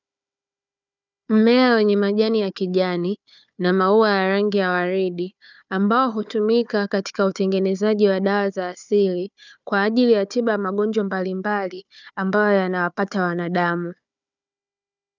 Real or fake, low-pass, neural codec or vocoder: fake; 7.2 kHz; codec, 16 kHz, 4 kbps, FunCodec, trained on Chinese and English, 50 frames a second